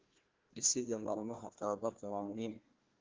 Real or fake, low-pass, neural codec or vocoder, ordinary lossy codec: fake; 7.2 kHz; codec, 16 kHz, 1 kbps, FunCodec, trained on Chinese and English, 50 frames a second; Opus, 16 kbps